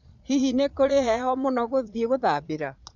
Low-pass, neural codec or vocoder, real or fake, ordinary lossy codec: 7.2 kHz; vocoder, 22.05 kHz, 80 mel bands, Vocos; fake; none